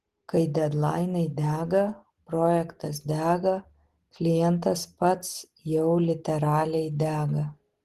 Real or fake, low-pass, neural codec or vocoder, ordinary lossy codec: real; 14.4 kHz; none; Opus, 16 kbps